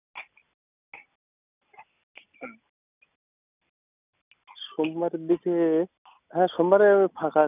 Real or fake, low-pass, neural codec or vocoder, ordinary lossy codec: real; 3.6 kHz; none; none